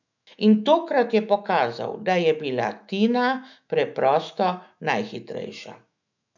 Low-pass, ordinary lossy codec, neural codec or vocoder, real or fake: 7.2 kHz; none; autoencoder, 48 kHz, 128 numbers a frame, DAC-VAE, trained on Japanese speech; fake